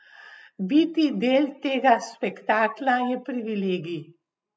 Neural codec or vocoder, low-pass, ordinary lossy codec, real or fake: none; none; none; real